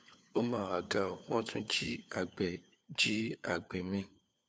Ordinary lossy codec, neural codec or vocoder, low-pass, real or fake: none; codec, 16 kHz, 4 kbps, FunCodec, trained on LibriTTS, 50 frames a second; none; fake